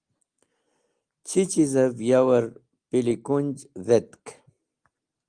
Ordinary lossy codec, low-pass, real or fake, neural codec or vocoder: Opus, 24 kbps; 9.9 kHz; real; none